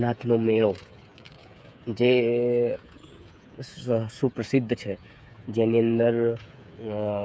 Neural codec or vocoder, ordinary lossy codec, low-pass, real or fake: codec, 16 kHz, 8 kbps, FreqCodec, smaller model; none; none; fake